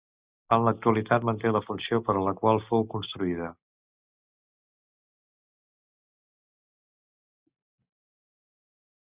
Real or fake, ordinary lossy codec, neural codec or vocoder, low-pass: real; Opus, 64 kbps; none; 3.6 kHz